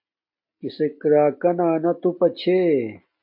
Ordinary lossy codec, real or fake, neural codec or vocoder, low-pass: MP3, 32 kbps; real; none; 5.4 kHz